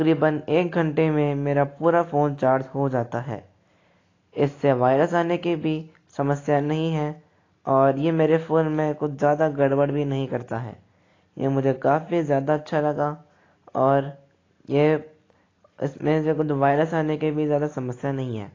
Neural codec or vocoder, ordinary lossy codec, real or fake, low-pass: none; AAC, 32 kbps; real; 7.2 kHz